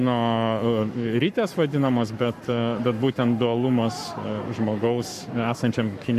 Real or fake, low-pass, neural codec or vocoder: fake; 14.4 kHz; codec, 44.1 kHz, 7.8 kbps, Pupu-Codec